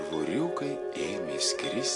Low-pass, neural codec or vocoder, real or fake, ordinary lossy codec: 10.8 kHz; none; real; AAC, 48 kbps